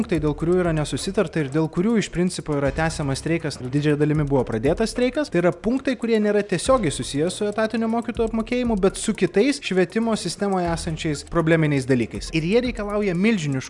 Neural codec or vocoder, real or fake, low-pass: none; real; 10.8 kHz